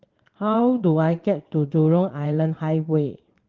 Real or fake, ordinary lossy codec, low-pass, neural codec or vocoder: fake; Opus, 16 kbps; 7.2 kHz; vocoder, 22.05 kHz, 80 mel bands, Vocos